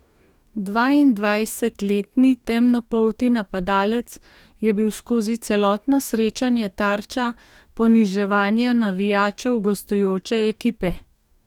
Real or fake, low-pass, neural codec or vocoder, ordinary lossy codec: fake; 19.8 kHz; codec, 44.1 kHz, 2.6 kbps, DAC; none